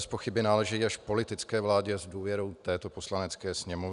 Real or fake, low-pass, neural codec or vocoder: real; 10.8 kHz; none